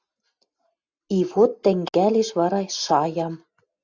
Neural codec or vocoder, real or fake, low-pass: none; real; 7.2 kHz